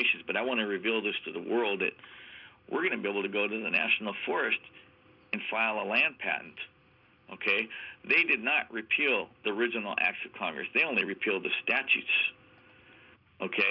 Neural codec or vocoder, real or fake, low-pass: none; real; 5.4 kHz